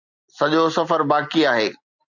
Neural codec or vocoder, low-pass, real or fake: none; 7.2 kHz; real